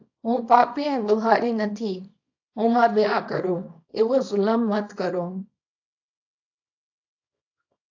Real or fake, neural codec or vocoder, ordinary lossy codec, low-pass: fake; codec, 24 kHz, 0.9 kbps, WavTokenizer, small release; AAC, 48 kbps; 7.2 kHz